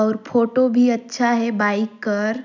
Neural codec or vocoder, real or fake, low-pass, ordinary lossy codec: none; real; 7.2 kHz; none